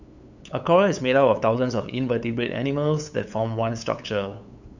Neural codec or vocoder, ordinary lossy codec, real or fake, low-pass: codec, 16 kHz, 8 kbps, FunCodec, trained on LibriTTS, 25 frames a second; none; fake; 7.2 kHz